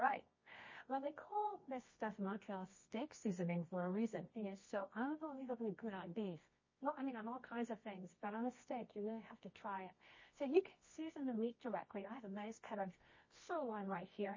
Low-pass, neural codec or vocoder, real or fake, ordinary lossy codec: 7.2 kHz; codec, 24 kHz, 0.9 kbps, WavTokenizer, medium music audio release; fake; MP3, 32 kbps